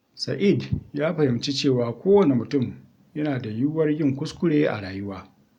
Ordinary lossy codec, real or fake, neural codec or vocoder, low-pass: none; real; none; 19.8 kHz